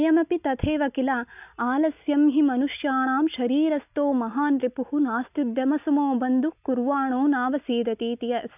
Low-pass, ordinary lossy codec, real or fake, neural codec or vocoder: 3.6 kHz; none; real; none